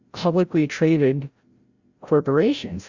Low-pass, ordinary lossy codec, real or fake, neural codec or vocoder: 7.2 kHz; Opus, 64 kbps; fake; codec, 16 kHz, 0.5 kbps, FreqCodec, larger model